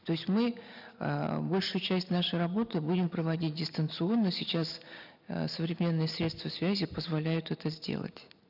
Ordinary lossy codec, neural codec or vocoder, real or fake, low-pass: none; none; real; 5.4 kHz